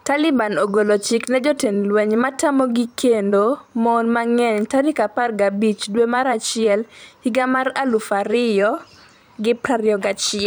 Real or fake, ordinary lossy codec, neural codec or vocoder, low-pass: fake; none; vocoder, 44.1 kHz, 128 mel bands every 512 samples, BigVGAN v2; none